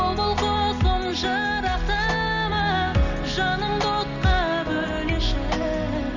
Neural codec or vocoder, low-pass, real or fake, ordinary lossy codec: none; 7.2 kHz; real; none